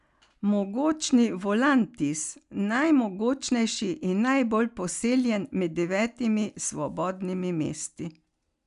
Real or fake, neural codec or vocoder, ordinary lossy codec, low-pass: real; none; none; 10.8 kHz